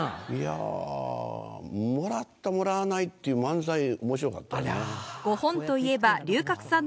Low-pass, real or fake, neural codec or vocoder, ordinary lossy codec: none; real; none; none